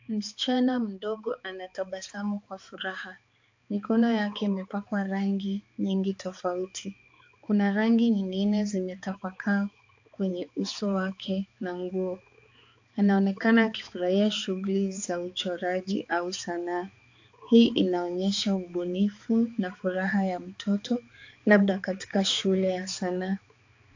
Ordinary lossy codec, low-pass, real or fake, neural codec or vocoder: AAC, 48 kbps; 7.2 kHz; fake; codec, 16 kHz, 4 kbps, X-Codec, HuBERT features, trained on balanced general audio